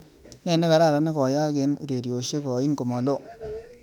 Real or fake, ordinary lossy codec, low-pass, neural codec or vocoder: fake; none; 19.8 kHz; autoencoder, 48 kHz, 32 numbers a frame, DAC-VAE, trained on Japanese speech